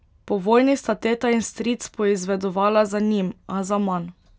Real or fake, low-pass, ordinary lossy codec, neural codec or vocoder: real; none; none; none